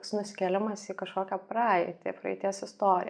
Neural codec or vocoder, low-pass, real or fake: none; 9.9 kHz; real